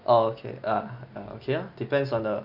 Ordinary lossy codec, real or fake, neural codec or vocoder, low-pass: none; real; none; 5.4 kHz